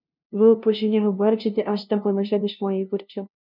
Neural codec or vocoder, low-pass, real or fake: codec, 16 kHz, 0.5 kbps, FunCodec, trained on LibriTTS, 25 frames a second; 5.4 kHz; fake